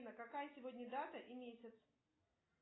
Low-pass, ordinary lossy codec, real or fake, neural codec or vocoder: 7.2 kHz; AAC, 16 kbps; real; none